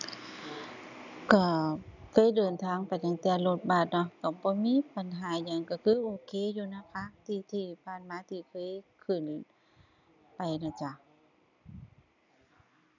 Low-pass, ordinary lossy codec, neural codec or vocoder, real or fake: 7.2 kHz; none; none; real